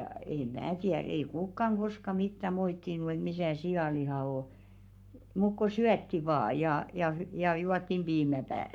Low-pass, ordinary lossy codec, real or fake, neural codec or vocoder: 19.8 kHz; none; fake; codec, 44.1 kHz, 7.8 kbps, Pupu-Codec